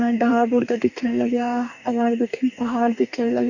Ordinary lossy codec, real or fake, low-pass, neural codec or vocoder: none; fake; 7.2 kHz; codec, 44.1 kHz, 2.6 kbps, DAC